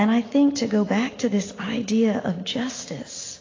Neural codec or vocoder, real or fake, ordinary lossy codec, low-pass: none; real; AAC, 32 kbps; 7.2 kHz